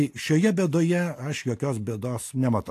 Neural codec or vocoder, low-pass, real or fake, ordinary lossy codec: none; 14.4 kHz; real; AAC, 64 kbps